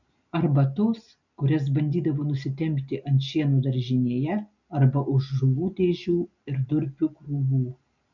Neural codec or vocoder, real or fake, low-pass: none; real; 7.2 kHz